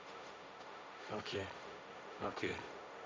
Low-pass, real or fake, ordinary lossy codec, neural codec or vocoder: none; fake; none; codec, 16 kHz, 1.1 kbps, Voila-Tokenizer